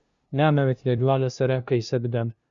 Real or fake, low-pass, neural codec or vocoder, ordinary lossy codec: fake; 7.2 kHz; codec, 16 kHz, 0.5 kbps, FunCodec, trained on LibriTTS, 25 frames a second; Opus, 64 kbps